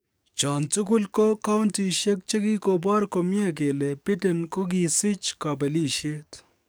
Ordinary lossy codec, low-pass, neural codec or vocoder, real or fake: none; none; codec, 44.1 kHz, 7.8 kbps, DAC; fake